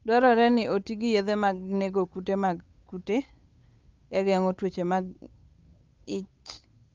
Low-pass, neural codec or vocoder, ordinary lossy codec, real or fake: 7.2 kHz; codec, 16 kHz, 8 kbps, FunCodec, trained on Chinese and English, 25 frames a second; Opus, 32 kbps; fake